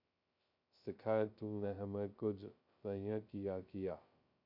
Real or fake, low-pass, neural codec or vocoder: fake; 5.4 kHz; codec, 16 kHz, 0.2 kbps, FocalCodec